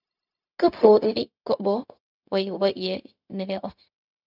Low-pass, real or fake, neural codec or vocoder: 5.4 kHz; fake; codec, 16 kHz, 0.4 kbps, LongCat-Audio-Codec